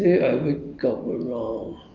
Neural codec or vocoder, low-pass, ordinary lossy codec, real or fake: none; 7.2 kHz; Opus, 24 kbps; real